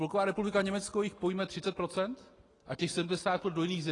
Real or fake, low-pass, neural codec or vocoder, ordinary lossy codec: real; 10.8 kHz; none; AAC, 32 kbps